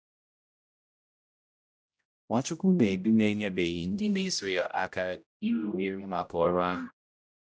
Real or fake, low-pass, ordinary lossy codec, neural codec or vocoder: fake; none; none; codec, 16 kHz, 0.5 kbps, X-Codec, HuBERT features, trained on general audio